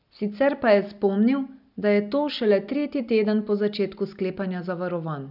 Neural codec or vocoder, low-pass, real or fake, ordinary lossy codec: none; 5.4 kHz; real; none